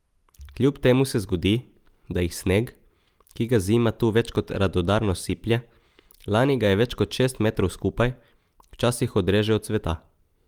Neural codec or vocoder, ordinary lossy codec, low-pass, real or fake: vocoder, 44.1 kHz, 128 mel bands every 256 samples, BigVGAN v2; Opus, 32 kbps; 19.8 kHz; fake